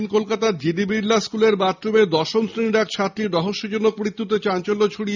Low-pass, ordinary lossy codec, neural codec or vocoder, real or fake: 7.2 kHz; none; none; real